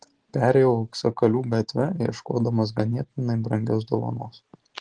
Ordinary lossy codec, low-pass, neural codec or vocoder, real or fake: Opus, 16 kbps; 9.9 kHz; none; real